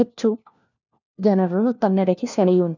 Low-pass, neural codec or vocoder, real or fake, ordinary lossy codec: 7.2 kHz; codec, 16 kHz, 1 kbps, FunCodec, trained on LibriTTS, 50 frames a second; fake; none